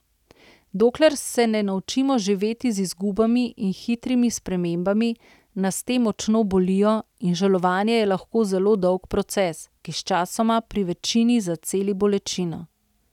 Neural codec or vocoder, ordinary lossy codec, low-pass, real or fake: none; none; 19.8 kHz; real